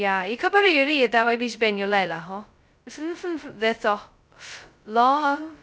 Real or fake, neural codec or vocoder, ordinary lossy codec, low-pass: fake; codec, 16 kHz, 0.2 kbps, FocalCodec; none; none